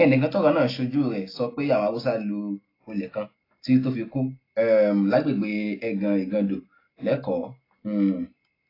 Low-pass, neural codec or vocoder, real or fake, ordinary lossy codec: 5.4 kHz; none; real; AAC, 24 kbps